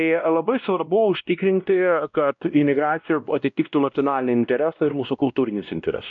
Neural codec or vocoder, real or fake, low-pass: codec, 16 kHz, 1 kbps, X-Codec, WavLM features, trained on Multilingual LibriSpeech; fake; 7.2 kHz